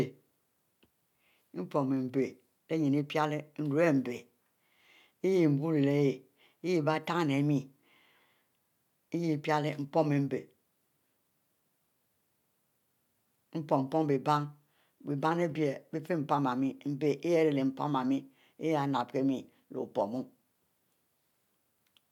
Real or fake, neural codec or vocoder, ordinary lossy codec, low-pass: real; none; none; 19.8 kHz